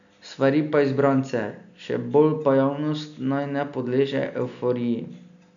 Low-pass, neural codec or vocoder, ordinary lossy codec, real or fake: 7.2 kHz; none; none; real